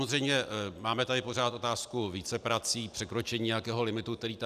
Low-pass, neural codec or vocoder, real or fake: 14.4 kHz; none; real